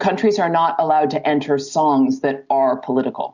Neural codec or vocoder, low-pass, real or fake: none; 7.2 kHz; real